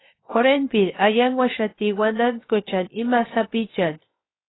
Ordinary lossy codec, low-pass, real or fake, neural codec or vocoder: AAC, 16 kbps; 7.2 kHz; fake; codec, 16 kHz, 0.7 kbps, FocalCodec